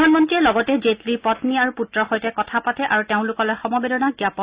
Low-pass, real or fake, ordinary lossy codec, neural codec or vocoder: 3.6 kHz; real; Opus, 64 kbps; none